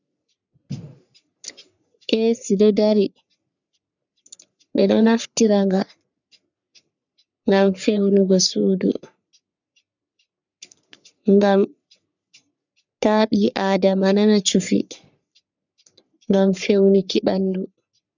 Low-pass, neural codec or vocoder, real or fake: 7.2 kHz; codec, 44.1 kHz, 3.4 kbps, Pupu-Codec; fake